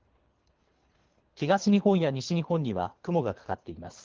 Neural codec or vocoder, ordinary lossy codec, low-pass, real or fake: codec, 24 kHz, 3 kbps, HILCodec; Opus, 16 kbps; 7.2 kHz; fake